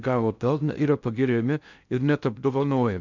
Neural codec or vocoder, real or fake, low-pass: codec, 16 kHz in and 24 kHz out, 0.6 kbps, FocalCodec, streaming, 2048 codes; fake; 7.2 kHz